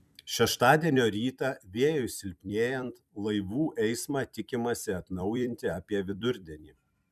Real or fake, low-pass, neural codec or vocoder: fake; 14.4 kHz; vocoder, 44.1 kHz, 128 mel bands every 256 samples, BigVGAN v2